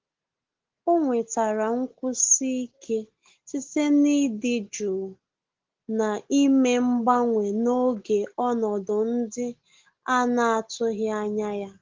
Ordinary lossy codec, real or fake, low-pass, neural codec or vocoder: Opus, 16 kbps; real; 7.2 kHz; none